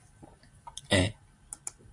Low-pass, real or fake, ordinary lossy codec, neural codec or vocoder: 10.8 kHz; real; AAC, 64 kbps; none